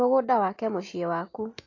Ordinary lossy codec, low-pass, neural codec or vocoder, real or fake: AAC, 32 kbps; 7.2 kHz; none; real